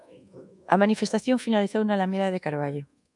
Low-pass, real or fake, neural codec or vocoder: 10.8 kHz; fake; codec, 24 kHz, 1.2 kbps, DualCodec